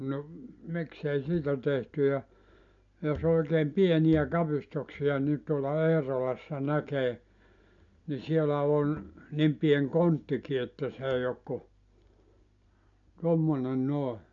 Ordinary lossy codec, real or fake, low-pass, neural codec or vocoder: AAC, 64 kbps; real; 7.2 kHz; none